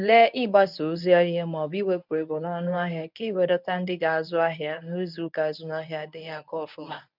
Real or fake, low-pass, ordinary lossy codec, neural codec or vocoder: fake; 5.4 kHz; none; codec, 24 kHz, 0.9 kbps, WavTokenizer, medium speech release version 1